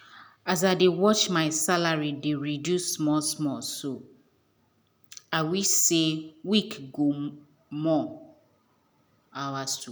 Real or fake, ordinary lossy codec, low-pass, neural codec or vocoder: real; none; none; none